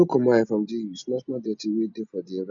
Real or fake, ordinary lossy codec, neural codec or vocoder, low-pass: real; none; none; 7.2 kHz